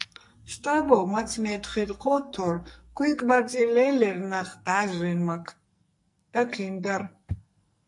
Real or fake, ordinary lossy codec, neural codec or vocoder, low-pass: fake; MP3, 48 kbps; codec, 44.1 kHz, 2.6 kbps, SNAC; 10.8 kHz